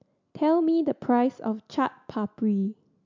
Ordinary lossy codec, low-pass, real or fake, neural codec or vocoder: MP3, 64 kbps; 7.2 kHz; real; none